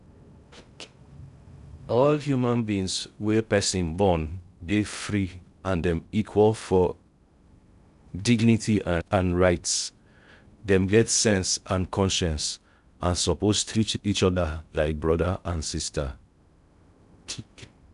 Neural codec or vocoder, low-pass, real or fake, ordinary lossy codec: codec, 16 kHz in and 24 kHz out, 0.6 kbps, FocalCodec, streaming, 2048 codes; 10.8 kHz; fake; none